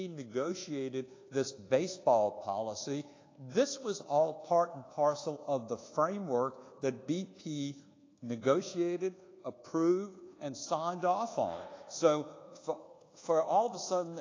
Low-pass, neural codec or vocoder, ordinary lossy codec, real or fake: 7.2 kHz; codec, 24 kHz, 1.2 kbps, DualCodec; AAC, 32 kbps; fake